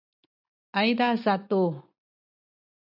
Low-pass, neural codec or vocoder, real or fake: 5.4 kHz; none; real